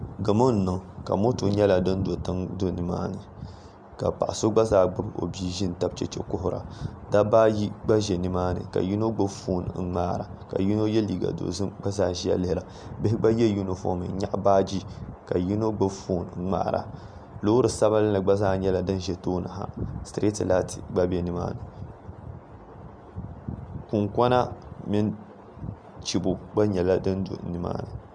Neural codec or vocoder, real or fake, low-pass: none; real; 9.9 kHz